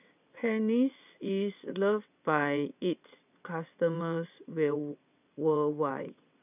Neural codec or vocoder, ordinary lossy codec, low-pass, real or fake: vocoder, 22.05 kHz, 80 mel bands, WaveNeXt; none; 3.6 kHz; fake